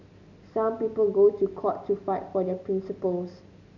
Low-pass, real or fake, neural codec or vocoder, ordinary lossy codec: 7.2 kHz; real; none; none